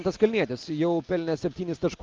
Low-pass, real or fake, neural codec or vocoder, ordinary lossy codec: 7.2 kHz; real; none; Opus, 24 kbps